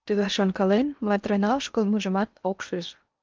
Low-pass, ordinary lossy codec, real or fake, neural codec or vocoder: 7.2 kHz; Opus, 24 kbps; fake; codec, 16 kHz in and 24 kHz out, 0.8 kbps, FocalCodec, streaming, 65536 codes